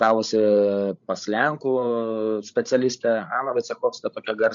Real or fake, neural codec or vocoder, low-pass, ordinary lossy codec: fake; codec, 16 kHz, 16 kbps, FreqCodec, larger model; 7.2 kHz; MP3, 64 kbps